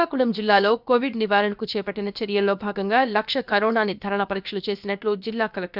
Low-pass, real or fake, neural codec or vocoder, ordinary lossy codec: 5.4 kHz; fake; codec, 16 kHz, about 1 kbps, DyCAST, with the encoder's durations; none